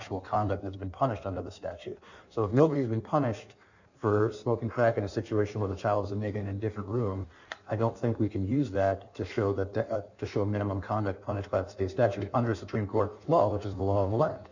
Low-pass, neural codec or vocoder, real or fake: 7.2 kHz; codec, 16 kHz in and 24 kHz out, 1.1 kbps, FireRedTTS-2 codec; fake